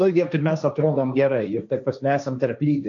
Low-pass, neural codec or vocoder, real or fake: 7.2 kHz; codec, 16 kHz, 1.1 kbps, Voila-Tokenizer; fake